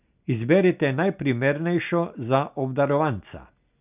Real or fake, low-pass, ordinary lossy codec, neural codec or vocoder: real; 3.6 kHz; none; none